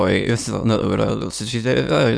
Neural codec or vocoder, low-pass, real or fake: autoencoder, 22.05 kHz, a latent of 192 numbers a frame, VITS, trained on many speakers; 9.9 kHz; fake